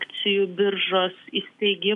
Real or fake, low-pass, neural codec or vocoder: real; 10.8 kHz; none